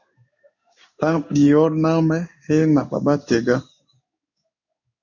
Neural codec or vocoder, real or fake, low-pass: codec, 16 kHz in and 24 kHz out, 1 kbps, XY-Tokenizer; fake; 7.2 kHz